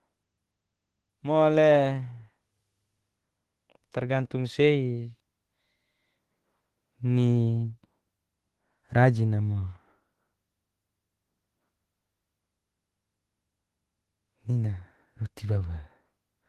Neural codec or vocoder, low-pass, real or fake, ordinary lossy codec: autoencoder, 48 kHz, 32 numbers a frame, DAC-VAE, trained on Japanese speech; 14.4 kHz; fake; Opus, 16 kbps